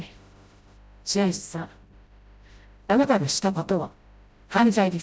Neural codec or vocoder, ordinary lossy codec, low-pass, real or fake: codec, 16 kHz, 0.5 kbps, FreqCodec, smaller model; none; none; fake